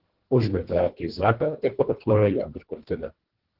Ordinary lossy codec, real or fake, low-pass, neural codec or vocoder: Opus, 16 kbps; fake; 5.4 kHz; codec, 24 kHz, 1.5 kbps, HILCodec